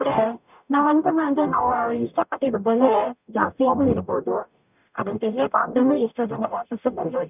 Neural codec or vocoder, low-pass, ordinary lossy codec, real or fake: codec, 44.1 kHz, 0.9 kbps, DAC; 3.6 kHz; none; fake